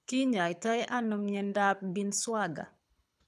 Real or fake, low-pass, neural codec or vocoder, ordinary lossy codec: fake; none; codec, 24 kHz, 6 kbps, HILCodec; none